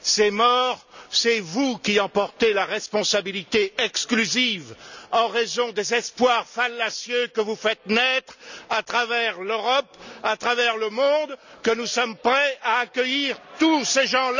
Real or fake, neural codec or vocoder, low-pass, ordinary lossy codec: real; none; 7.2 kHz; none